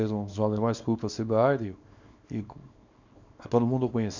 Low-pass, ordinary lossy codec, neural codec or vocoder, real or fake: 7.2 kHz; none; codec, 24 kHz, 0.9 kbps, WavTokenizer, small release; fake